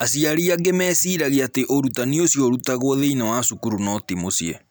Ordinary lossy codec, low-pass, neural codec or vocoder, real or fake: none; none; none; real